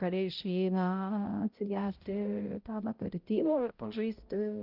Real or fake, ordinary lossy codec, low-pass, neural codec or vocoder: fake; Opus, 32 kbps; 5.4 kHz; codec, 16 kHz, 0.5 kbps, X-Codec, HuBERT features, trained on balanced general audio